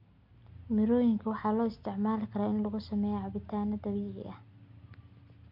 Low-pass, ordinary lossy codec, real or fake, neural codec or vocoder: 5.4 kHz; MP3, 48 kbps; real; none